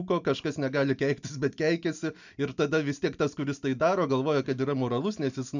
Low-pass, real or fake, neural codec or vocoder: 7.2 kHz; real; none